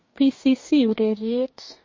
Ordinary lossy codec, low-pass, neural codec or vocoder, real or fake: MP3, 32 kbps; 7.2 kHz; codec, 44.1 kHz, 2.6 kbps, DAC; fake